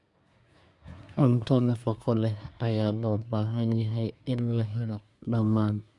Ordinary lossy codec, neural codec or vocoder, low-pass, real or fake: none; codec, 24 kHz, 1 kbps, SNAC; 10.8 kHz; fake